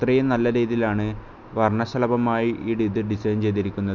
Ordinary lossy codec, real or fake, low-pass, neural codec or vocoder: none; real; 7.2 kHz; none